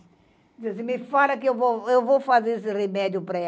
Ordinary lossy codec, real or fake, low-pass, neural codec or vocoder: none; real; none; none